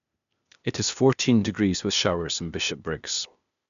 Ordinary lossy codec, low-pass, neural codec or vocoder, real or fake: none; 7.2 kHz; codec, 16 kHz, 0.8 kbps, ZipCodec; fake